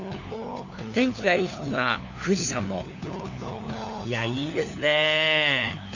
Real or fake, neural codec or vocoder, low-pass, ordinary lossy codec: fake; codec, 16 kHz, 4 kbps, FunCodec, trained on LibriTTS, 50 frames a second; 7.2 kHz; none